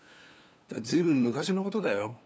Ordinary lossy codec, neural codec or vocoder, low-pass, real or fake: none; codec, 16 kHz, 2 kbps, FunCodec, trained on LibriTTS, 25 frames a second; none; fake